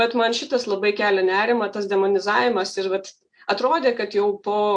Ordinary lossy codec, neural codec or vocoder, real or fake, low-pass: AAC, 64 kbps; none; real; 9.9 kHz